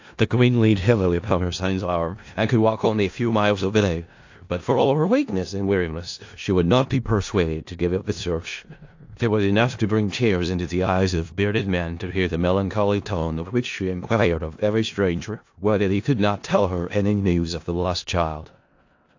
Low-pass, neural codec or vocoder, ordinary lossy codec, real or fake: 7.2 kHz; codec, 16 kHz in and 24 kHz out, 0.4 kbps, LongCat-Audio-Codec, four codebook decoder; AAC, 48 kbps; fake